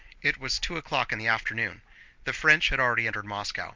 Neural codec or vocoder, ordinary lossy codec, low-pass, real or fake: none; Opus, 32 kbps; 7.2 kHz; real